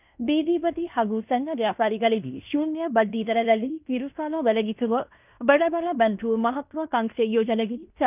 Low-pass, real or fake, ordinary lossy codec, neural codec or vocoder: 3.6 kHz; fake; none; codec, 16 kHz in and 24 kHz out, 0.9 kbps, LongCat-Audio-Codec, fine tuned four codebook decoder